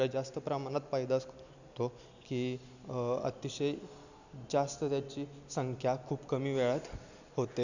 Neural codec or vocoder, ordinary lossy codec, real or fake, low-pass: none; none; real; 7.2 kHz